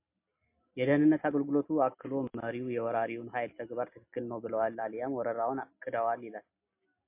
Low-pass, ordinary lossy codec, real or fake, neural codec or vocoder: 3.6 kHz; MP3, 24 kbps; real; none